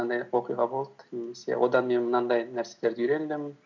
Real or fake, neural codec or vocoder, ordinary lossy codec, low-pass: real; none; none; 7.2 kHz